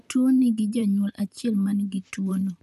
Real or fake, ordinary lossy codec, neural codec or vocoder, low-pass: fake; none; vocoder, 44.1 kHz, 128 mel bands, Pupu-Vocoder; 14.4 kHz